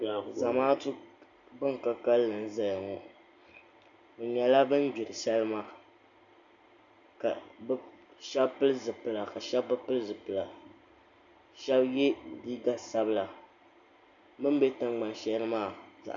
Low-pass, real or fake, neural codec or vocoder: 7.2 kHz; real; none